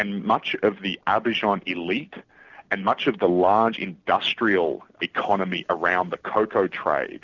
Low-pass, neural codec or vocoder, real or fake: 7.2 kHz; none; real